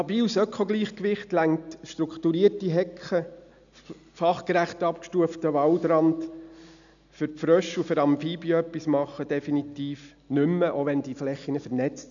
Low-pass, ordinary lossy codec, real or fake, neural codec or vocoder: 7.2 kHz; none; real; none